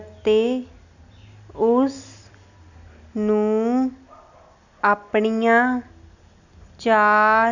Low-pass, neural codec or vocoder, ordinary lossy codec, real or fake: 7.2 kHz; none; none; real